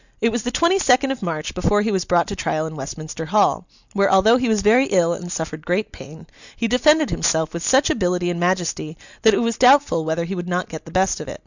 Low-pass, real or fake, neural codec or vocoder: 7.2 kHz; real; none